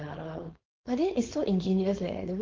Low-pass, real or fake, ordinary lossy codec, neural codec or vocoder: 7.2 kHz; fake; Opus, 16 kbps; codec, 16 kHz, 4.8 kbps, FACodec